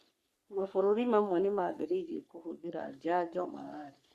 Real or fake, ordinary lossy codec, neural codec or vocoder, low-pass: fake; Opus, 64 kbps; codec, 44.1 kHz, 3.4 kbps, Pupu-Codec; 14.4 kHz